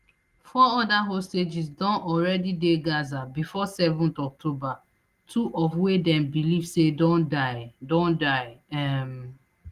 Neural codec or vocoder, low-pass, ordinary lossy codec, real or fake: none; 14.4 kHz; Opus, 24 kbps; real